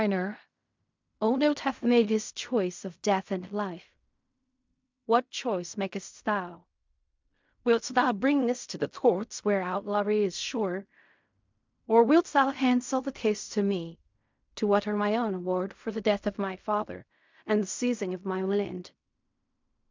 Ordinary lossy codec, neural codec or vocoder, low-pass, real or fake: MP3, 64 kbps; codec, 16 kHz in and 24 kHz out, 0.4 kbps, LongCat-Audio-Codec, fine tuned four codebook decoder; 7.2 kHz; fake